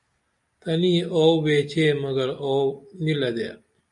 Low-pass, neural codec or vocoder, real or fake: 10.8 kHz; none; real